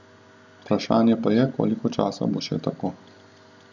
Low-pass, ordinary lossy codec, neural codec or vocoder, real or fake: 7.2 kHz; none; none; real